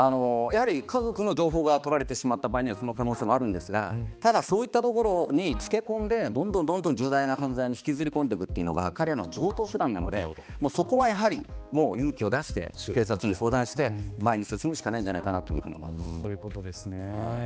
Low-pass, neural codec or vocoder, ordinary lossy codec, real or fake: none; codec, 16 kHz, 2 kbps, X-Codec, HuBERT features, trained on balanced general audio; none; fake